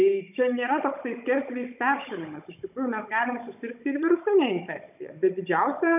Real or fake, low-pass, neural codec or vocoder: fake; 3.6 kHz; codec, 16 kHz, 16 kbps, FunCodec, trained on Chinese and English, 50 frames a second